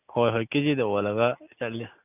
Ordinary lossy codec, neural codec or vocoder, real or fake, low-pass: none; none; real; 3.6 kHz